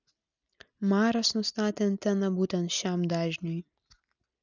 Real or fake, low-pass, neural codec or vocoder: real; 7.2 kHz; none